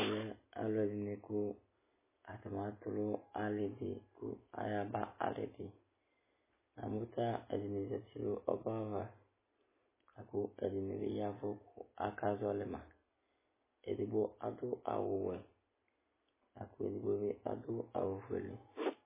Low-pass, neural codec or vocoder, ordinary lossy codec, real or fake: 3.6 kHz; none; MP3, 16 kbps; real